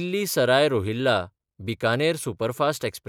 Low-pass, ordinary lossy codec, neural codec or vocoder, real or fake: 19.8 kHz; none; none; real